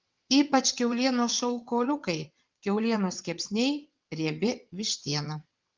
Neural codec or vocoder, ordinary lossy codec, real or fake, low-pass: vocoder, 22.05 kHz, 80 mel bands, WaveNeXt; Opus, 32 kbps; fake; 7.2 kHz